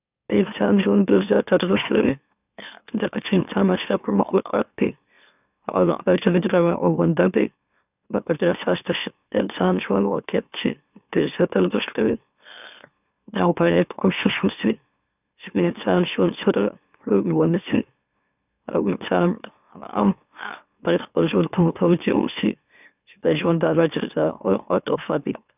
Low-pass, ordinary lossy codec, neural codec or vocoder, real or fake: 3.6 kHz; none; autoencoder, 44.1 kHz, a latent of 192 numbers a frame, MeloTTS; fake